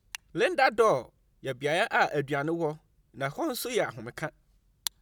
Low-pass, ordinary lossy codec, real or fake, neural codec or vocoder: none; none; real; none